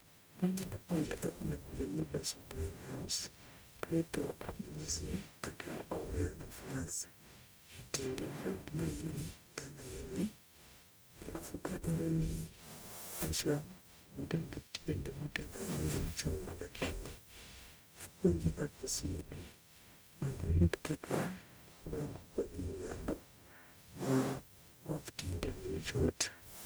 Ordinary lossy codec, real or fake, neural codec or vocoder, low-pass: none; fake; codec, 44.1 kHz, 0.9 kbps, DAC; none